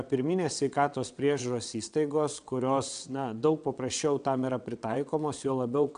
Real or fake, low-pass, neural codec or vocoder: fake; 9.9 kHz; vocoder, 22.05 kHz, 80 mel bands, WaveNeXt